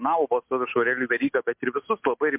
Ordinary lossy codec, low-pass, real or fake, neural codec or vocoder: MP3, 32 kbps; 3.6 kHz; real; none